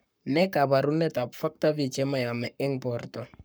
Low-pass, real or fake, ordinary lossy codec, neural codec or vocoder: none; fake; none; codec, 44.1 kHz, 7.8 kbps, Pupu-Codec